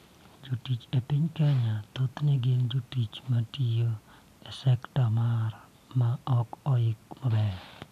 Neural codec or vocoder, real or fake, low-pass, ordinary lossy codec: none; real; 14.4 kHz; none